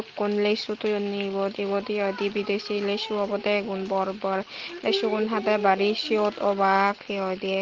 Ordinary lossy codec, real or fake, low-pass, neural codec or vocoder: Opus, 16 kbps; real; 7.2 kHz; none